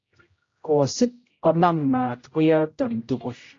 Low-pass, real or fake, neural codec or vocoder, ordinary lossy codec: 7.2 kHz; fake; codec, 16 kHz, 0.5 kbps, X-Codec, HuBERT features, trained on general audio; AAC, 32 kbps